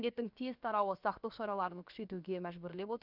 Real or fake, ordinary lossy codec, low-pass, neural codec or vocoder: fake; none; 5.4 kHz; codec, 16 kHz, about 1 kbps, DyCAST, with the encoder's durations